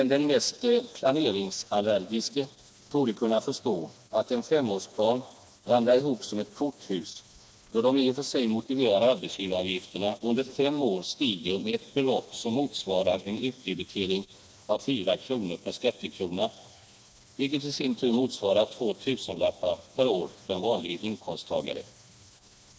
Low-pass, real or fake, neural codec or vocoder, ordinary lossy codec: none; fake; codec, 16 kHz, 2 kbps, FreqCodec, smaller model; none